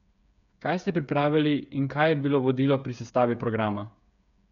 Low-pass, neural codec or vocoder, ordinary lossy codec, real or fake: 7.2 kHz; codec, 16 kHz, 8 kbps, FreqCodec, smaller model; none; fake